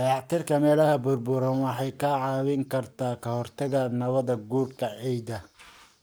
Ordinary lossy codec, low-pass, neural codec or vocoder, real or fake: none; none; codec, 44.1 kHz, 7.8 kbps, Pupu-Codec; fake